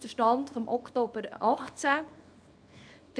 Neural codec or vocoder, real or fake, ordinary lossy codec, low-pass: codec, 24 kHz, 0.9 kbps, WavTokenizer, small release; fake; none; 9.9 kHz